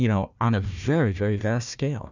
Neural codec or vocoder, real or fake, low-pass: codec, 16 kHz, 1 kbps, FunCodec, trained on Chinese and English, 50 frames a second; fake; 7.2 kHz